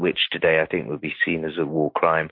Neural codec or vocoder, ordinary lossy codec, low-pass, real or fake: none; MP3, 32 kbps; 5.4 kHz; real